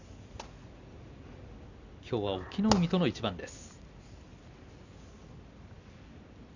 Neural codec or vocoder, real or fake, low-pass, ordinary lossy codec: none; real; 7.2 kHz; none